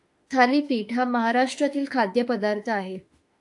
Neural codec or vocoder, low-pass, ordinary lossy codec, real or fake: autoencoder, 48 kHz, 32 numbers a frame, DAC-VAE, trained on Japanese speech; 10.8 kHz; AAC, 64 kbps; fake